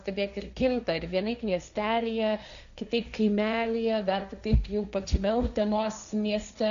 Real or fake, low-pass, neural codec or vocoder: fake; 7.2 kHz; codec, 16 kHz, 1.1 kbps, Voila-Tokenizer